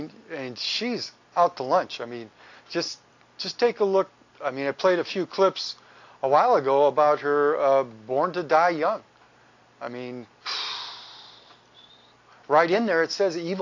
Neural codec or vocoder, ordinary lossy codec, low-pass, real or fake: none; AAC, 48 kbps; 7.2 kHz; real